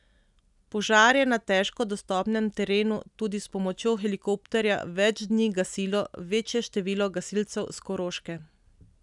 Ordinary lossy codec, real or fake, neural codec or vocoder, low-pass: none; real; none; 10.8 kHz